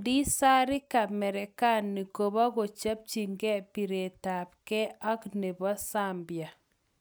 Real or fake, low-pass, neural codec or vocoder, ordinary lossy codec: real; none; none; none